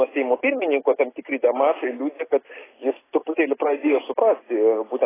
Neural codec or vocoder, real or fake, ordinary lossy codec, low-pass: none; real; AAC, 16 kbps; 3.6 kHz